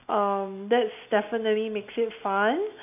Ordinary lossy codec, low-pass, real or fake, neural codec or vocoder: none; 3.6 kHz; real; none